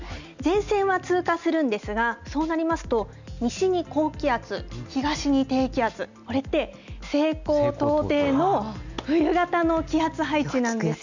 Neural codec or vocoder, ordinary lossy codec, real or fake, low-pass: none; none; real; 7.2 kHz